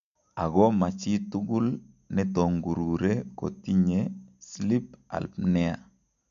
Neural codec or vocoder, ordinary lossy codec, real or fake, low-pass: none; MP3, 64 kbps; real; 7.2 kHz